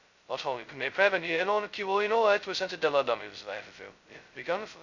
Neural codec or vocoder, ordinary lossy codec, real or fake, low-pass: codec, 16 kHz, 0.2 kbps, FocalCodec; none; fake; 7.2 kHz